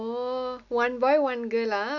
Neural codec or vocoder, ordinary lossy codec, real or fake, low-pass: none; none; real; 7.2 kHz